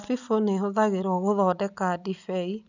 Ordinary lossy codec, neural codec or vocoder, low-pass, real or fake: none; none; 7.2 kHz; real